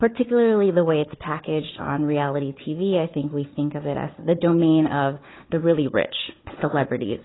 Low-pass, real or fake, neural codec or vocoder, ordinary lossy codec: 7.2 kHz; fake; codec, 16 kHz, 4 kbps, FunCodec, trained on Chinese and English, 50 frames a second; AAC, 16 kbps